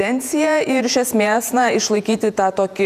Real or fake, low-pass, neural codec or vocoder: fake; 14.4 kHz; vocoder, 48 kHz, 128 mel bands, Vocos